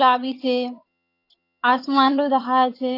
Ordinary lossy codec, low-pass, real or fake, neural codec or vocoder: AAC, 32 kbps; 5.4 kHz; fake; vocoder, 22.05 kHz, 80 mel bands, HiFi-GAN